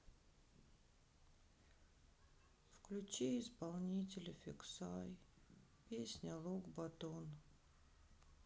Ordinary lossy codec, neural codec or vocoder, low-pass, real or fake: none; none; none; real